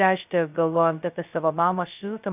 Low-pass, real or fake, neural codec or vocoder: 3.6 kHz; fake; codec, 16 kHz, 0.2 kbps, FocalCodec